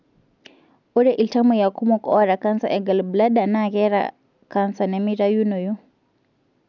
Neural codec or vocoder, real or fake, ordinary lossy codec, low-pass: none; real; none; 7.2 kHz